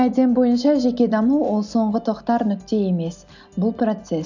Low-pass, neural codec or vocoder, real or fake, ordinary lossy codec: 7.2 kHz; none; real; none